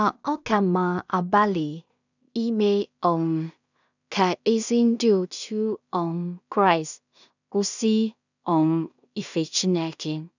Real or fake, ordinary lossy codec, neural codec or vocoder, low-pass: fake; none; codec, 16 kHz in and 24 kHz out, 0.4 kbps, LongCat-Audio-Codec, two codebook decoder; 7.2 kHz